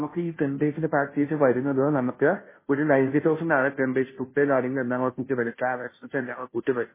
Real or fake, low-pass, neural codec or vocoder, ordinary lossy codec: fake; 3.6 kHz; codec, 16 kHz, 0.5 kbps, FunCodec, trained on Chinese and English, 25 frames a second; MP3, 16 kbps